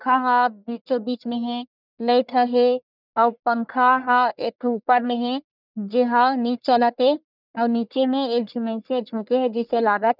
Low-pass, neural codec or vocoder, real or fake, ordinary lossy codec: 5.4 kHz; codec, 44.1 kHz, 1.7 kbps, Pupu-Codec; fake; none